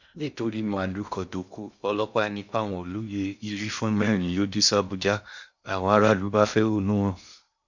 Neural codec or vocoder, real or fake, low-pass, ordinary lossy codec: codec, 16 kHz in and 24 kHz out, 0.6 kbps, FocalCodec, streaming, 2048 codes; fake; 7.2 kHz; none